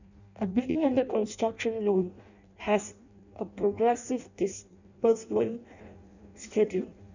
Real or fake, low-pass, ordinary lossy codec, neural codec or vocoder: fake; 7.2 kHz; none; codec, 16 kHz in and 24 kHz out, 0.6 kbps, FireRedTTS-2 codec